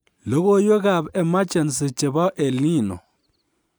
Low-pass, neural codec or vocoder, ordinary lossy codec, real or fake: none; none; none; real